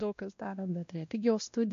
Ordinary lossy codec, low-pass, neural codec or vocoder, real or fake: MP3, 48 kbps; 7.2 kHz; codec, 16 kHz, 1 kbps, X-Codec, WavLM features, trained on Multilingual LibriSpeech; fake